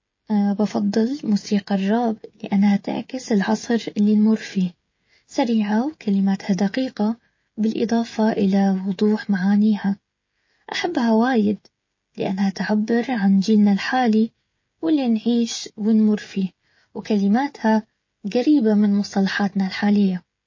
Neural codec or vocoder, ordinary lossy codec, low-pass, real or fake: codec, 16 kHz, 16 kbps, FreqCodec, smaller model; MP3, 32 kbps; 7.2 kHz; fake